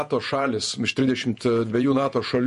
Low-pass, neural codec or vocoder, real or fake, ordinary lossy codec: 14.4 kHz; vocoder, 48 kHz, 128 mel bands, Vocos; fake; MP3, 48 kbps